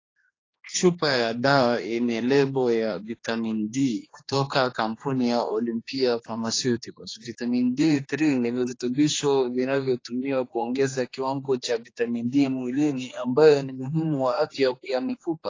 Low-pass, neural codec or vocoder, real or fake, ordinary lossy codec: 7.2 kHz; codec, 16 kHz, 2 kbps, X-Codec, HuBERT features, trained on general audio; fake; AAC, 32 kbps